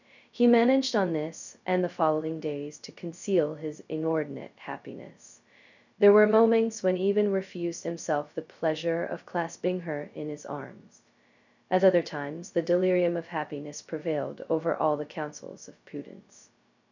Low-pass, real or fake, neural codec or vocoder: 7.2 kHz; fake; codec, 16 kHz, 0.2 kbps, FocalCodec